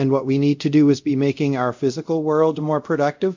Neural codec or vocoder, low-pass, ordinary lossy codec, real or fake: codec, 24 kHz, 0.5 kbps, DualCodec; 7.2 kHz; MP3, 64 kbps; fake